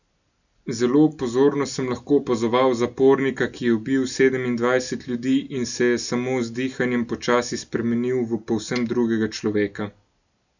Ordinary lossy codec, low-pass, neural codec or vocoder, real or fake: none; 7.2 kHz; none; real